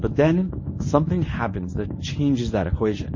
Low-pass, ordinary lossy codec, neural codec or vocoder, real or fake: 7.2 kHz; MP3, 32 kbps; codec, 24 kHz, 0.9 kbps, WavTokenizer, medium speech release version 1; fake